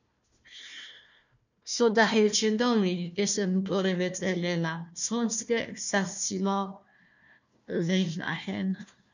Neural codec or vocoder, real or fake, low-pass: codec, 16 kHz, 1 kbps, FunCodec, trained on Chinese and English, 50 frames a second; fake; 7.2 kHz